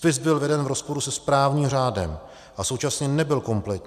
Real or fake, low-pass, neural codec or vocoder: real; 14.4 kHz; none